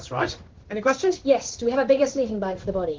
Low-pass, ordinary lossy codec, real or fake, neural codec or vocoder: 7.2 kHz; Opus, 16 kbps; fake; codec, 16 kHz in and 24 kHz out, 1 kbps, XY-Tokenizer